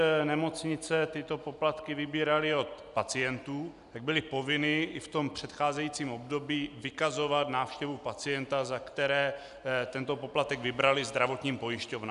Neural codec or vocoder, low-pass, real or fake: none; 10.8 kHz; real